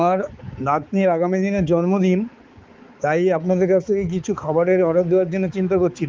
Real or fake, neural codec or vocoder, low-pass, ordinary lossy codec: fake; codec, 16 kHz, 4 kbps, X-Codec, HuBERT features, trained on balanced general audio; 7.2 kHz; Opus, 32 kbps